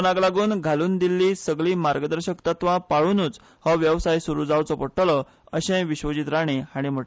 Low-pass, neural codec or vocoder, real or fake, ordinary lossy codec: none; none; real; none